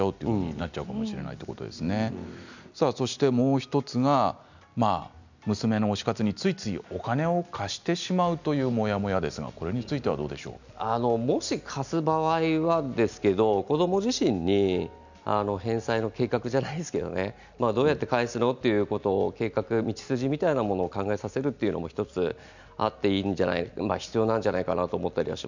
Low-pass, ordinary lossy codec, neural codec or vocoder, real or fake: 7.2 kHz; none; none; real